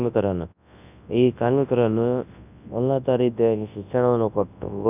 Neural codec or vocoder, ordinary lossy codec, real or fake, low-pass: codec, 24 kHz, 0.9 kbps, WavTokenizer, large speech release; none; fake; 3.6 kHz